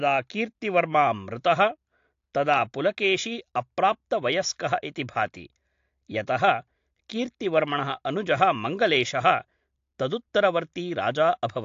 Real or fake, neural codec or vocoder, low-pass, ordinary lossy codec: real; none; 7.2 kHz; AAC, 48 kbps